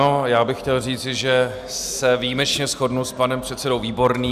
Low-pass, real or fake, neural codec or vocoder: 14.4 kHz; real; none